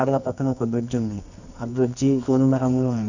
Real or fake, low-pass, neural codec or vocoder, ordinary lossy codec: fake; 7.2 kHz; codec, 24 kHz, 0.9 kbps, WavTokenizer, medium music audio release; none